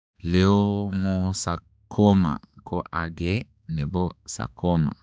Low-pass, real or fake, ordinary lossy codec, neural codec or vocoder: none; fake; none; codec, 16 kHz, 2 kbps, X-Codec, HuBERT features, trained on balanced general audio